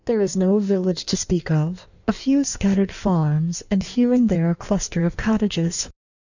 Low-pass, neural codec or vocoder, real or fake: 7.2 kHz; codec, 16 kHz in and 24 kHz out, 1.1 kbps, FireRedTTS-2 codec; fake